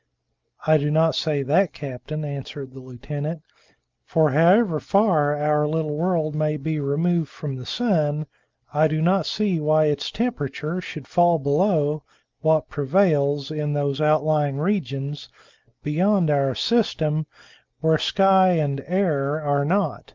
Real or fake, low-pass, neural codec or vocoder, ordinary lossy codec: real; 7.2 kHz; none; Opus, 24 kbps